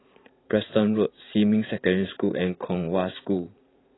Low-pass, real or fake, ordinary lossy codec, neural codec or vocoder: 7.2 kHz; fake; AAC, 16 kbps; vocoder, 44.1 kHz, 80 mel bands, Vocos